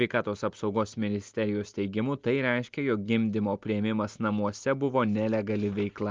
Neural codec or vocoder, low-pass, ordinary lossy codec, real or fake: none; 7.2 kHz; Opus, 32 kbps; real